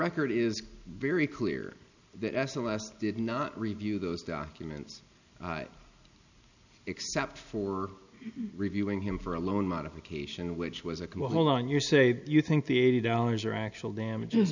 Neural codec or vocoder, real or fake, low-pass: none; real; 7.2 kHz